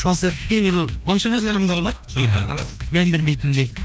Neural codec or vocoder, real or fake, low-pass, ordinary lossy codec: codec, 16 kHz, 1 kbps, FreqCodec, larger model; fake; none; none